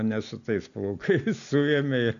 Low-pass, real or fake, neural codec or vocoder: 7.2 kHz; real; none